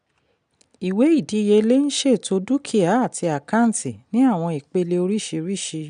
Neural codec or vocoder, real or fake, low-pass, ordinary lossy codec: none; real; 9.9 kHz; none